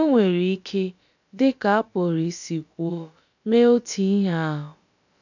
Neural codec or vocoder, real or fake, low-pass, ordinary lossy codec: codec, 16 kHz, about 1 kbps, DyCAST, with the encoder's durations; fake; 7.2 kHz; none